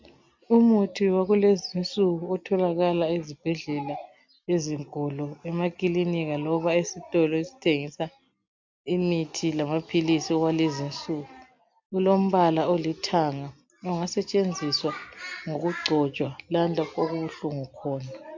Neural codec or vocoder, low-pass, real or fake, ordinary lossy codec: none; 7.2 kHz; real; MP3, 64 kbps